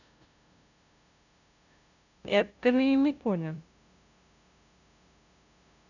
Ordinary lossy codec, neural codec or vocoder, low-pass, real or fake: none; codec, 16 kHz, 0.5 kbps, FunCodec, trained on LibriTTS, 25 frames a second; 7.2 kHz; fake